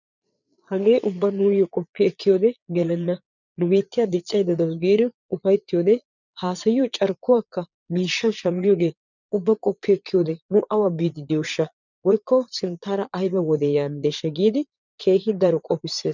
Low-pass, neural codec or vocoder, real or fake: 7.2 kHz; vocoder, 22.05 kHz, 80 mel bands, Vocos; fake